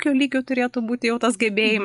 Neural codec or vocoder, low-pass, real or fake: none; 10.8 kHz; real